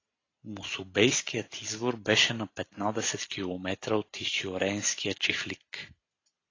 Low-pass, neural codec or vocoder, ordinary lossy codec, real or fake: 7.2 kHz; none; AAC, 32 kbps; real